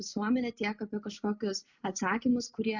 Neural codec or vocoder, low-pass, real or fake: none; 7.2 kHz; real